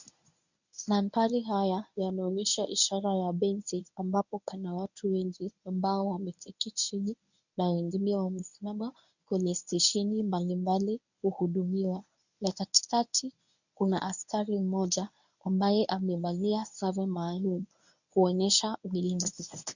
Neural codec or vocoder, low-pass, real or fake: codec, 24 kHz, 0.9 kbps, WavTokenizer, medium speech release version 1; 7.2 kHz; fake